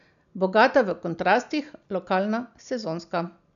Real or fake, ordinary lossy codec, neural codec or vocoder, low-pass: real; none; none; 7.2 kHz